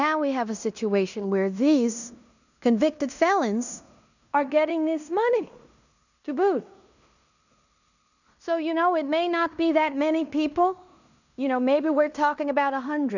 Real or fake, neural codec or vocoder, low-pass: fake; codec, 16 kHz in and 24 kHz out, 0.9 kbps, LongCat-Audio-Codec, fine tuned four codebook decoder; 7.2 kHz